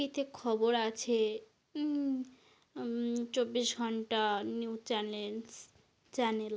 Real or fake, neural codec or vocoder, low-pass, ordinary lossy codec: real; none; none; none